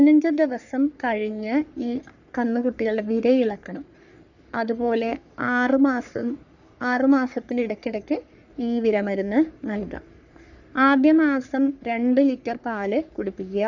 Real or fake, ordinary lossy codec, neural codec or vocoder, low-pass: fake; none; codec, 44.1 kHz, 3.4 kbps, Pupu-Codec; 7.2 kHz